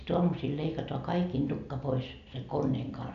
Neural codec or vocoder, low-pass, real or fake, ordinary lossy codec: none; 7.2 kHz; real; none